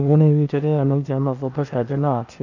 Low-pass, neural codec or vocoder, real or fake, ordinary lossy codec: 7.2 kHz; codec, 16 kHz, 0.8 kbps, ZipCodec; fake; none